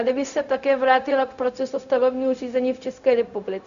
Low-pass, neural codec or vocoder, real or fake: 7.2 kHz; codec, 16 kHz, 0.4 kbps, LongCat-Audio-Codec; fake